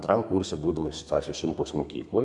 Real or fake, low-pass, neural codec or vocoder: fake; 10.8 kHz; codec, 32 kHz, 1.9 kbps, SNAC